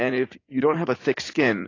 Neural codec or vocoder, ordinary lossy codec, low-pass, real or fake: vocoder, 44.1 kHz, 80 mel bands, Vocos; AAC, 32 kbps; 7.2 kHz; fake